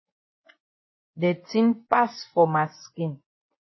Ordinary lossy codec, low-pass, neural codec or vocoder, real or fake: MP3, 24 kbps; 7.2 kHz; vocoder, 24 kHz, 100 mel bands, Vocos; fake